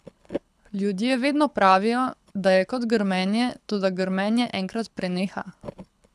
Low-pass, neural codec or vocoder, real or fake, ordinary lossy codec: none; codec, 24 kHz, 6 kbps, HILCodec; fake; none